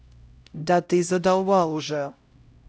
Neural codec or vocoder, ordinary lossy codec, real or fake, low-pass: codec, 16 kHz, 0.5 kbps, X-Codec, HuBERT features, trained on LibriSpeech; none; fake; none